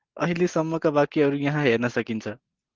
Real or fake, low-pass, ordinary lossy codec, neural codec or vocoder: real; 7.2 kHz; Opus, 16 kbps; none